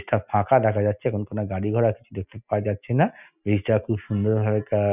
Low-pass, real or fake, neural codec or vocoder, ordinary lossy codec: 3.6 kHz; real; none; none